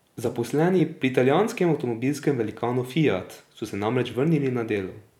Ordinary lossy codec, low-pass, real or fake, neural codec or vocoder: none; 19.8 kHz; fake; vocoder, 44.1 kHz, 128 mel bands every 256 samples, BigVGAN v2